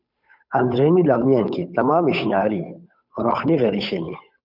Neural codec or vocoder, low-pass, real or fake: codec, 16 kHz, 8 kbps, FunCodec, trained on Chinese and English, 25 frames a second; 5.4 kHz; fake